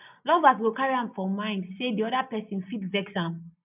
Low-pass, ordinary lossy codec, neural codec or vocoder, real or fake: 3.6 kHz; none; none; real